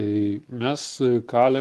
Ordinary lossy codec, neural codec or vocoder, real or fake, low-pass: Opus, 24 kbps; none; real; 14.4 kHz